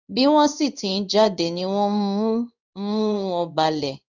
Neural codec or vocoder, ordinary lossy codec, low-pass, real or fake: codec, 16 kHz in and 24 kHz out, 1 kbps, XY-Tokenizer; none; 7.2 kHz; fake